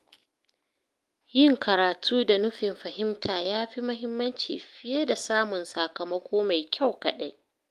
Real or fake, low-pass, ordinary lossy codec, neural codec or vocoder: fake; 14.4 kHz; Opus, 24 kbps; autoencoder, 48 kHz, 128 numbers a frame, DAC-VAE, trained on Japanese speech